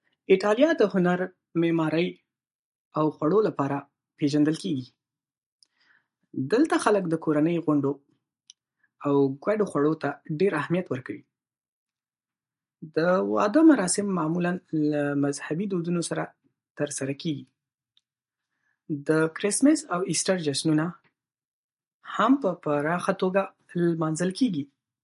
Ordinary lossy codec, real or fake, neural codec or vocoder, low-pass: MP3, 48 kbps; real; none; 14.4 kHz